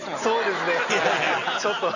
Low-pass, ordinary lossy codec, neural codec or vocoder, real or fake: 7.2 kHz; none; none; real